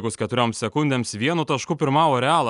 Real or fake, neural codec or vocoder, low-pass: real; none; 10.8 kHz